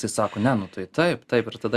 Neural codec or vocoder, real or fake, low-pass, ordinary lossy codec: none; real; 14.4 kHz; Opus, 64 kbps